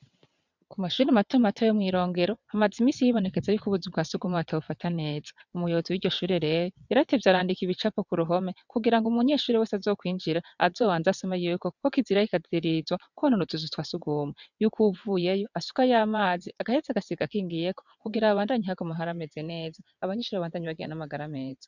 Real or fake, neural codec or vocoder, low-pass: fake; vocoder, 22.05 kHz, 80 mel bands, WaveNeXt; 7.2 kHz